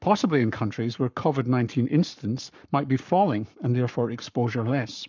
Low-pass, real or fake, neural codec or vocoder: 7.2 kHz; fake; codec, 44.1 kHz, 7.8 kbps, Pupu-Codec